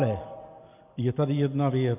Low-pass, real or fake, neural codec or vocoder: 3.6 kHz; real; none